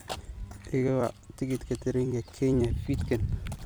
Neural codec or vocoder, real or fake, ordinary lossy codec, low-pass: vocoder, 44.1 kHz, 128 mel bands every 256 samples, BigVGAN v2; fake; none; none